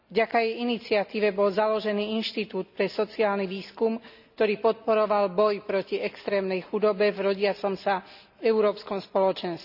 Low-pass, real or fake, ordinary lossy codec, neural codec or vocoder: 5.4 kHz; real; none; none